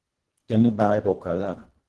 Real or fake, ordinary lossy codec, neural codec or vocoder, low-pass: fake; Opus, 16 kbps; codec, 24 kHz, 1.5 kbps, HILCodec; 10.8 kHz